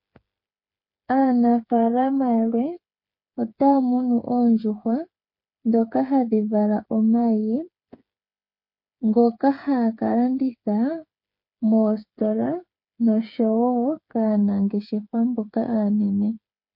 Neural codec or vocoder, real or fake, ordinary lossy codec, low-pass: codec, 16 kHz, 8 kbps, FreqCodec, smaller model; fake; MP3, 32 kbps; 5.4 kHz